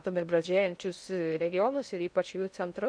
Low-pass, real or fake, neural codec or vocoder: 9.9 kHz; fake; codec, 16 kHz in and 24 kHz out, 0.6 kbps, FocalCodec, streaming, 2048 codes